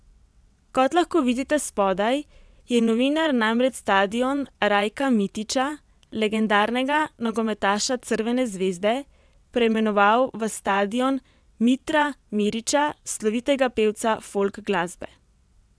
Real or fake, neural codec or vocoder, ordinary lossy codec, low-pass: fake; vocoder, 22.05 kHz, 80 mel bands, WaveNeXt; none; none